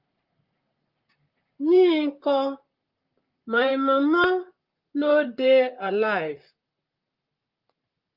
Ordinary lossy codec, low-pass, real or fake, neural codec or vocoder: Opus, 32 kbps; 5.4 kHz; fake; vocoder, 44.1 kHz, 128 mel bands, Pupu-Vocoder